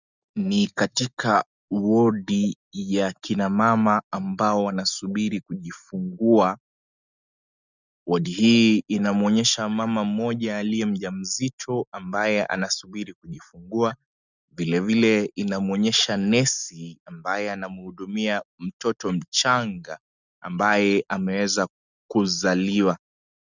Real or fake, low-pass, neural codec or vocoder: real; 7.2 kHz; none